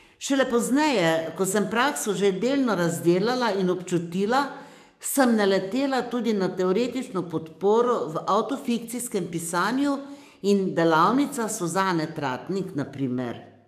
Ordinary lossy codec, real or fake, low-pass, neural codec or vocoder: none; fake; 14.4 kHz; codec, 44.1 kHz, 7.8 kbps, DAC